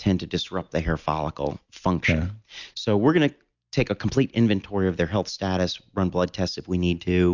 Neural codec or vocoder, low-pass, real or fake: none; 7.2 kHz; real